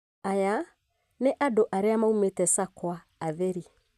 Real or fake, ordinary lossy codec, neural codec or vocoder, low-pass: real; none; none; 14.4 kHz